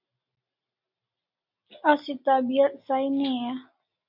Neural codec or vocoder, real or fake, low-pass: none; real; 5.4 kHz